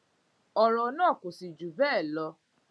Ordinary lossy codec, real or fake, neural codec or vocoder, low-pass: none; real; none; none